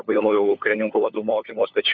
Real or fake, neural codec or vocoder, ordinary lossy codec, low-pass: fake; codec, 16 kHz, 4 kbps, FunCodec, trained on LibriTTS, 50 frames a second; MP3, 64 kbps; 7.2 kHz